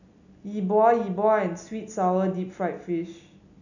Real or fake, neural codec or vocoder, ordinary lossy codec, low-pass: real; none; none; 7.2 kHz